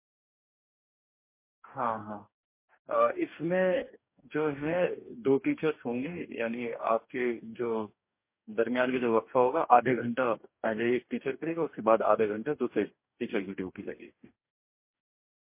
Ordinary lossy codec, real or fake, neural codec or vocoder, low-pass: MP3, 24 kbps; fake; codec, 44.1 kHz, 2.6 kbps, DAC; 3.6 kHz